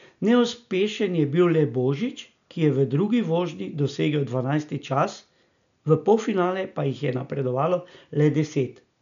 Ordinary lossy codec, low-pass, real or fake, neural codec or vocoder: none; 7.2 kHz; real; none